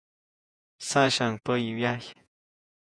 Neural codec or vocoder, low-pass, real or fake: vocoder, 48 kHz, 128 mel bands, Vocos; 9.9 kHz; fake